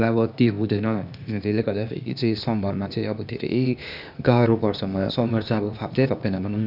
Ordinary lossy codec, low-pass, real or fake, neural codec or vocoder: none; 5.4 kHz; fake; codec, 16 kHz, 0.8 kbps, ZipCodec